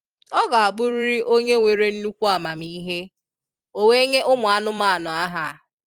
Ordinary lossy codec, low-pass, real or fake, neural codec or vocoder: Opus, 32 kbps; 19.8 kHz; fake; vocoder, 44.1 kHz, 128 mel bands every 256 samples, BigVGAN v2